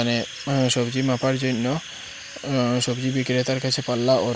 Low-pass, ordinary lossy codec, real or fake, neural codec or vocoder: none; none; real; none